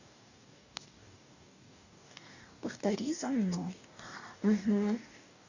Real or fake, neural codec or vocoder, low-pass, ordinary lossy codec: fake; codec, 44.1 kHz, 2.6 kbps, DAC; 7.2 kHz; none